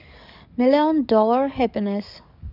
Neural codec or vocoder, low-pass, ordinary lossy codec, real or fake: codec, 44.1 kHz, 7.8 kbps, DAC; 5.4 kHz; none; fake